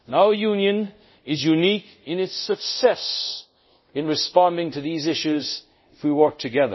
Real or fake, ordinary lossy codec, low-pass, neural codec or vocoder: fake; MP3, 24 kbps; 7.2 kHz; codec, 24 kHz, 0.5 kbps, DualCodec